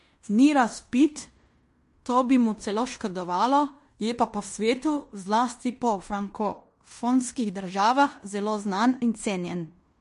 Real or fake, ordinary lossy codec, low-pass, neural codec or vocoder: fake; MP3, 48 kbps; 10.8 kHz; codec, 16 kHz in and 24 kHz out, 0.9 kbps, LongCat-Audio-Codec, fine tuned four codebook decoder